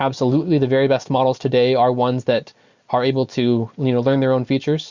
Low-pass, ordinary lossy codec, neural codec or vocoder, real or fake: 7.2 kHz; Opus, 64 kbps; none; real